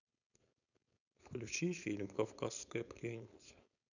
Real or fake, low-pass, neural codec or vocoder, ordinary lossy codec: fake; 7.2 kHz; codec, 16 kHz, 4.8 kbps, FACodec; none